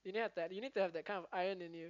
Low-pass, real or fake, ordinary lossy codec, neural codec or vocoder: 7.2 kHz; real; none; none